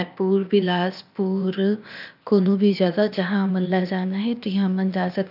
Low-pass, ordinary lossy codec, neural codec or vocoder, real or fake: 5.4 kHz; none; codec, 16 kHz, 0.8 kbps, ZipCodec; fake